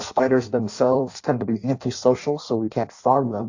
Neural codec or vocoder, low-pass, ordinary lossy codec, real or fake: codec, 16 kHz in and 24 kHz out, 0.6 kbps, FireRedTTS-2 codec; 7.2 kHz; AAC, 48 kbps; fake